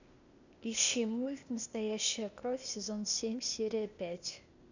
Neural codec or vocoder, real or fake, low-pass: codec, 16 kHz, 0.8 kbps, ZipCodec; fake; 7.2 kHz